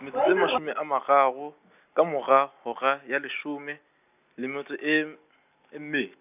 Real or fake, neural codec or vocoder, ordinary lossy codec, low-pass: real; none; none; 3.6 kHz